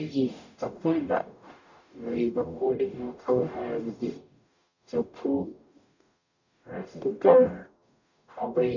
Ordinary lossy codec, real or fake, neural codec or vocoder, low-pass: none; fake; codec, 44.1 kHz, 0.9 kbps, DAC; 7.2 kHz